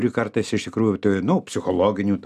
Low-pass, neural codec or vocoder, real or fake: 14.4 kHz; none; real